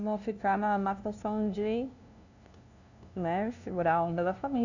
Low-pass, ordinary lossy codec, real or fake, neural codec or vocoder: 7.2 kHz; none; fake; codec, 16 kHz, 0.5 kbps, FunCodec, trained on LibriTTS, 25 frames a second